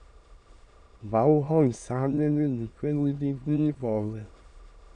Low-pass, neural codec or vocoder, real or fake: 9.9 kHz; autoencoder, 22.05 kHz, a latent of 192 numbers a frame, VITS, trained on many speakers; fake